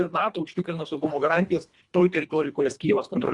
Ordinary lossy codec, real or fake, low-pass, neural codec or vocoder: Opus, 64 kbps; fake; 10.8 kHz; codec, 24 kHz, 1.5 kbps, HILCodec